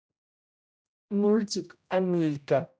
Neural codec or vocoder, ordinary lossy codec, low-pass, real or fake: codec, 16 kHz, 0.5 kbps, X-Codec, HuBERT features, trained on general audio; none; none; fake